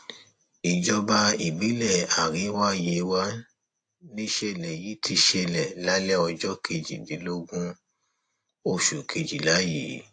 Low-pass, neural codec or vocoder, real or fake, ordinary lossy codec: 9.9 kHz; none; real; AAC, 48 kbps